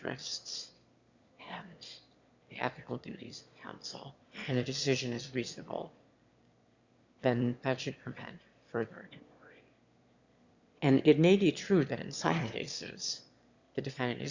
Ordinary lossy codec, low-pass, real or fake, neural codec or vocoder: Opus, 64 kbps; 7.2 kHz; fake; autoencoder, 22.05 kHz, a latent of 192 numbers a frame, VITS, trained on one speaker